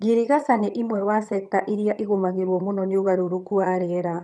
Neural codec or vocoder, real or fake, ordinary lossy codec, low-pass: vocoder, 22.05 kHz, 80 mel bands, HiFi-GAN; fake; none; none